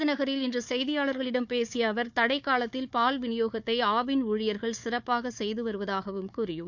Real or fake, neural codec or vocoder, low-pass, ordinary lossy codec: fake; codec, 16 kHz, 4 kbps, FunCodec, trained on Chinese and English, 50 frames a second; 7.2 kHz; none